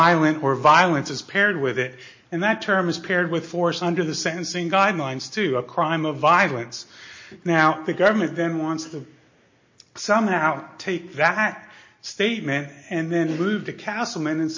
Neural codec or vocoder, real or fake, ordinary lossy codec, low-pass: none; real; MP3, 32 kbps; 7.2 kHz